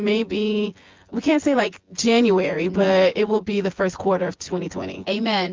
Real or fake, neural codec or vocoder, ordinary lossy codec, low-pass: fake; vocoder, 24 kHz, 100 mel bands, Vocos; Opus, 32 kbps; 7.2 kHz